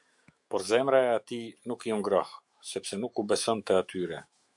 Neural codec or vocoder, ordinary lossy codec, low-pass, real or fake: autoencoder, 48 kHz, 128 numbers a frame, DAC-VAE, trained on Japanese speech; MP3, 64 kbps; 10.8 kHz; fake